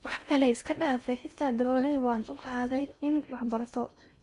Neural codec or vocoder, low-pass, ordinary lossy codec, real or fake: codec, 16 kHz in and 24 kHz out, 0.6 kbps, FocalCodec, streaming, 4096 codes; 10.8 kHz; MP3, 64 kbps; fake